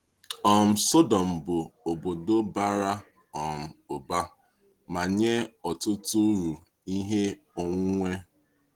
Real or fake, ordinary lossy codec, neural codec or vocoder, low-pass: real; Opus, 16 kbps; none; 19.8 kHz